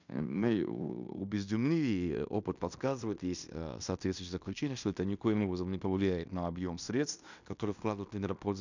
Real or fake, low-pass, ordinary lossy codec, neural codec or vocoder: fake; 7.2 kHz; none; codec, 16 kHz in and 24 kHz out, 0.9 kbps, LongCat-Audio-Codec, fine tuned four codebook decoder